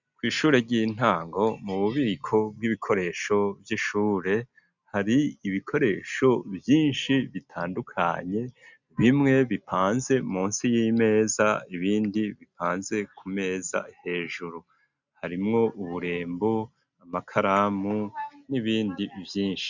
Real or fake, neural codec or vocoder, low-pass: real; none; 7.2 kHz